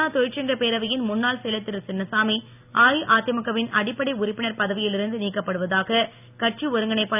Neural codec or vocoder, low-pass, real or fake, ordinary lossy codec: none; 3.6 kHz; real; none